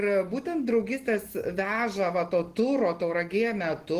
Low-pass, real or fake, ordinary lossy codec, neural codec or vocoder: 14.4 kHz; real; Opus, 16 kbps; none